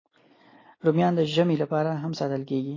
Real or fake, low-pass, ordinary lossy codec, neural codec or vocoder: real; 7.2 kHz; AAC, 32 kbps; none